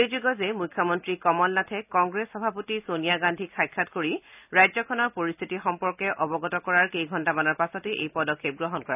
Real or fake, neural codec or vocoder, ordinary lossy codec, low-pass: real; none; none; 3.6 kHz